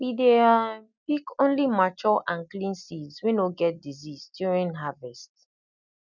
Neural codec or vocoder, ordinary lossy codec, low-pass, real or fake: none; none; 7.2 kHz; real